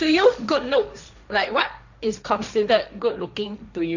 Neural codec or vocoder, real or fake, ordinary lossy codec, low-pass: codec, 16 kHz, 1.1 kbps, Voila-Tokenizer; fake; none; 7.2 kHz